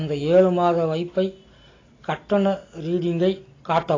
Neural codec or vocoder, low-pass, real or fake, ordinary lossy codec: none; 7.2 kHz; real; AAC, 32 kbps